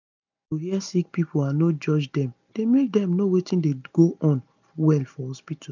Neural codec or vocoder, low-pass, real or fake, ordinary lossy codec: none; 7.2 kHz; real; none